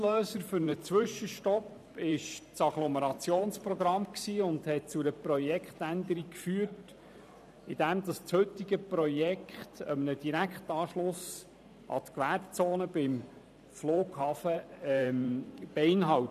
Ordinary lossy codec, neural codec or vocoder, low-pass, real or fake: none; vocoder, 44.1 kHz, 128 mel bands every 512 samples, BigVGAN v2; 14.4 kHz; fake